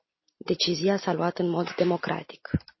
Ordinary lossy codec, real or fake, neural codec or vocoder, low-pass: MP3, 24 kbps; real; none; 7.2 kHz